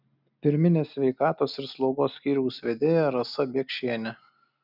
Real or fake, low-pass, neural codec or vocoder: real; 5.4 kHz; none